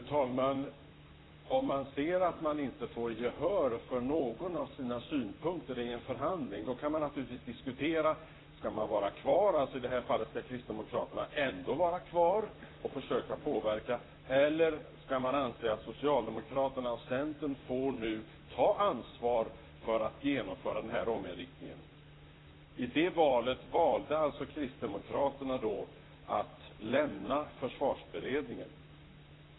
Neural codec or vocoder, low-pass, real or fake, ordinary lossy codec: vocoder, 44.1 kHz, 128 mel bands, Pupu-Vocoder; 7.2 kHz; fake; AAC, 16 kbps